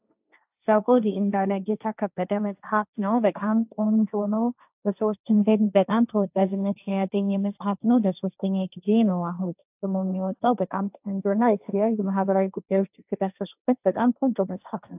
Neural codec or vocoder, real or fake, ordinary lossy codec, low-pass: codec, 16 kHz, 1.1 kbps, Voila-Tokenizer; fake; AAC, 32 kbps; 3.6 kHz